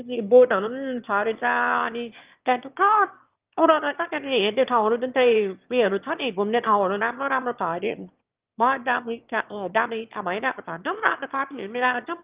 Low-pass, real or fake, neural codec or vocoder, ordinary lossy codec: 3.6 kHz; fake; autoencoder, 22.05 kHz, a latent of 192 numbers a frame, VITS, trained on one speaker; Opus, 32 kbps